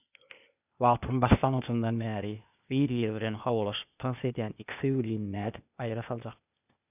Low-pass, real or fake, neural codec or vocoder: 3.6 kHz; fake; codec, 16 kHz, 0.8 kbps, ZipCodec